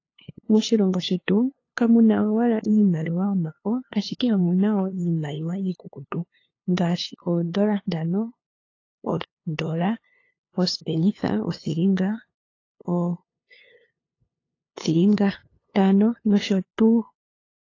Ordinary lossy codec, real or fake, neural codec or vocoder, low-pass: AAC, 32 kbps; fake; codec, 16 kHz, 2 kbps, FunCodec, trained on LibriTTS, 25 frames a second; 7.2 kHz